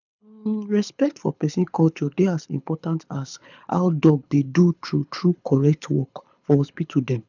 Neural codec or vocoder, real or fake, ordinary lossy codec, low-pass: codec, 24 kHz, 6 kbps, HILCodec; fake; none; 7.2 kHz